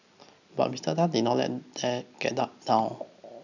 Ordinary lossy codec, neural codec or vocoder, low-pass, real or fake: none; none; 7.2 kHz; real